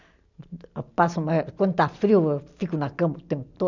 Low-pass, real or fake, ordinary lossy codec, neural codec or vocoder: 7.2 kHz; real; none; none